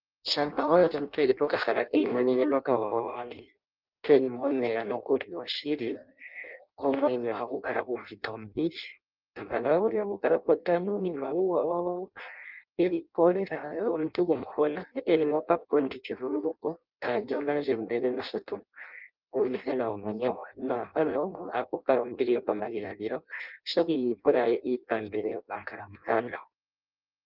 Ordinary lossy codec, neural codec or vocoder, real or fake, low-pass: Opus, 24 kbps; codec, 16 kHz in and 24 kHz out, 0.6 kbps, FireRedTTS-2 codec; fake; 5.4 kHz